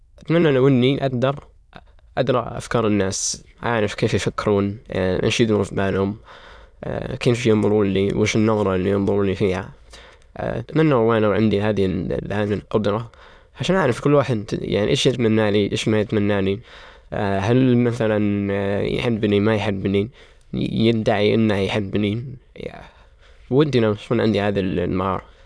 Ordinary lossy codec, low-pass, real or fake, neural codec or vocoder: none; none; fake; autoencoder, 22.05 kHz, a latent of 192 numbers a frame, VITS, trained on many speakers